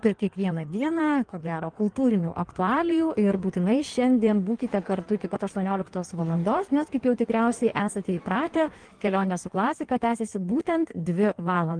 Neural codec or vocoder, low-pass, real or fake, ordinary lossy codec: codec, 16 kHz in and 24 kHz out, 1.1 kbps, FireRedTTS-2 codec; 9.9 kHz; fake; Opus, 24 kbps